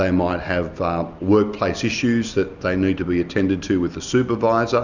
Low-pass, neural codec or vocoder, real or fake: 7.2 kHz; none; real